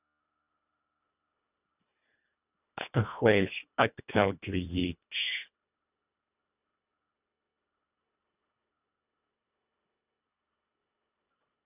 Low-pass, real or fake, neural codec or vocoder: 3.6 kHz; fake; codec, 24 kHz, 1.5 kbps, HILCodec